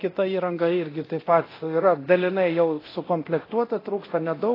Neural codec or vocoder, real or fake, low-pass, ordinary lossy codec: codec, 24 kHz, 0.9 kbps, DualCodec; fake; 5.4 kHz; AAC, 24 kbps